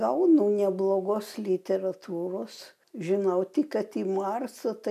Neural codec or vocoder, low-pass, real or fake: none; 14.4 kHz; real